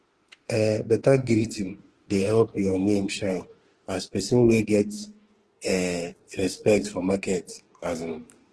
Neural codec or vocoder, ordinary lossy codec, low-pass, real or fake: autoencoder, 48 kHz, 32 numbers a frame, DAC-VAE, trained on Japanese speech; Opus, 16 kbps; 10.8 kHz; fake